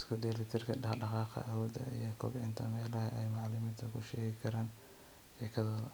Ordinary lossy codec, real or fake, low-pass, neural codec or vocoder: none; real; none; none